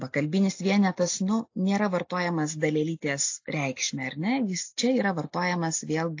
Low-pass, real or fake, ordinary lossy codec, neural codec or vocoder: 7.2 kHz; real; AAC, 48 kbps; none